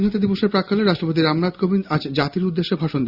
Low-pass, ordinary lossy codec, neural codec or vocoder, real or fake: 5.4 kHz; Opus, 64 kbps; none; real